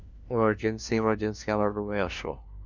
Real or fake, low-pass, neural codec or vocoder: fake; 7.2 kHz; codec, 16 kHz, 1 kbps, FunCodec, trained on LibriTTS, 50 frames a second